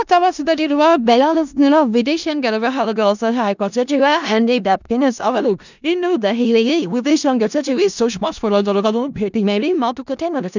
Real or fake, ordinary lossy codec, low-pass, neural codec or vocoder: fake; none; 7.2 kHz; codec, 16 kHz in and 24 kHz out, 0.4 kbps, LongCat-Audio-Codec, four codebook decoder